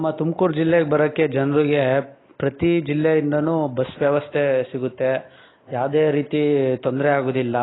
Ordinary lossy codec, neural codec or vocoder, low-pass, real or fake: AAC, 16 kbps; none; 7.2 kHz; real